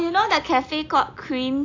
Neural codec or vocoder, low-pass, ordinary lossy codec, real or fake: none; 7.2 kHz; AAC, 48 kbps; real